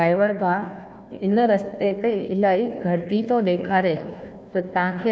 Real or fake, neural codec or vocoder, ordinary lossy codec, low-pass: fake; codec, 16 kHz, 1 kbps, FunCodec, trained on Chinese and English, 50 frames a second; none; none